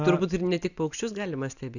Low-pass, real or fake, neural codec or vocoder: 7.2 kHz; real; none